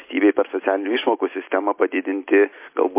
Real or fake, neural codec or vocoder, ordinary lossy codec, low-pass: real; none; MP3, 24 kbps; 3.6 kHz